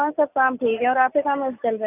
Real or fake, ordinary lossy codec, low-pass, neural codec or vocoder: real; none; 3.6 kHz; none